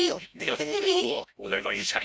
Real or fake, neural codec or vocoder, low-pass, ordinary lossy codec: fake; codec, 16 kHz, 0.5 kbps, FreqCodec, larger model; none; none